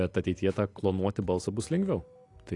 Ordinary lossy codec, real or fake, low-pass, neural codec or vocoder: AAC, 48 kbps; real; 10.8 kHz; none